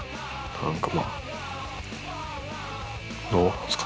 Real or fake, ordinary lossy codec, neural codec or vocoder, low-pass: real; none; none; none